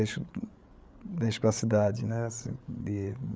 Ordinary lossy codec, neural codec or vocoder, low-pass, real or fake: none; codec, 16 kHz, 8 kbps, FreqCodec, larger model; none; fake